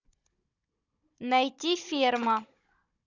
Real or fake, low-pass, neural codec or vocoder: fake; 7.2 kHz; codec, 16 kHz, 16 kbps, FunCodec, trained on Chinese and English, 50 frames a second